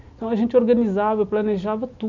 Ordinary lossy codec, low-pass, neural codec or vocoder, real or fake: none; 7.2 kHz; none; real